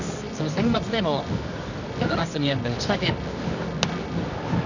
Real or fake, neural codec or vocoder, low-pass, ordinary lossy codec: fake; codec, 24 kHz, 0.9 kbps, WavTokenizer, medium music audio release; 7.2 kHz; none